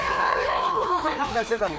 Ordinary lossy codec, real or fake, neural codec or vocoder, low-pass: none; fake; codec, 16 kHz, 2 kbps, FreqCodec, larger model; none